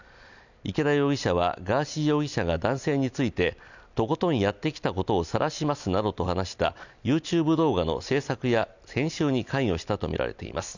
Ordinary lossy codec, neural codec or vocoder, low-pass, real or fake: none; none; 7.2 kHz; real